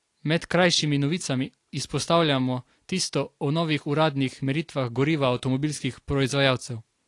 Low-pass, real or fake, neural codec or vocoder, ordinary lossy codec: 10.8 kHz; real; none; AAC, 48 kbps